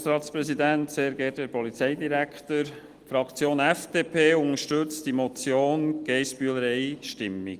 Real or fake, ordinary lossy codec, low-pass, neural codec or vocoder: real; Opus, 24 kbps; 14.4 kHz; none